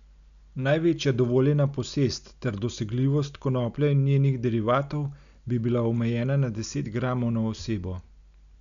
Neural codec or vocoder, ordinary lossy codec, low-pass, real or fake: none; none; 7.2 kHz; real